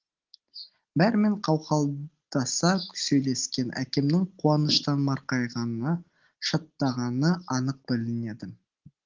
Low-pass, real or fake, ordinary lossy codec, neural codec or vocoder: 7.2 kHz; real; Opus, 16 kbps; none